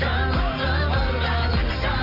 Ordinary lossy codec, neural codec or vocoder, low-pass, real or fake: none; none; 5.4 kHz; real